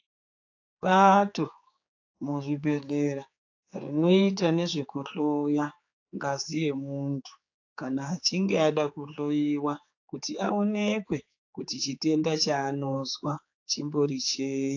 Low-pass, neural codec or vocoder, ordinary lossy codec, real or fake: 7.2 kHz; codec, 16 kHz, 4 kbps, X-Codec, HuBERT features, trained on general audio; AAC, 48 kbps; fake